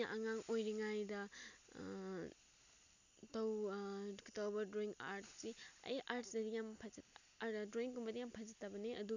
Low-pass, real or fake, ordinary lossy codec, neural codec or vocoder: 7.2 kHz; real; none; none